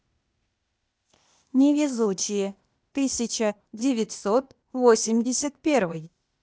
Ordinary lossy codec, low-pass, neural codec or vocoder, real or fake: none; none; codec, 16 kHz, 0.8 kbps, ZipCodec; fake